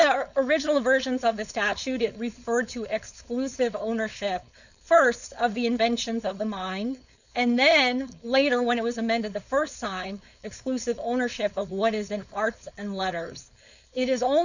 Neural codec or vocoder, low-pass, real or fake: codec, 16 kHz, 4.8 kbps, FACodec; 7.2 kHz; fake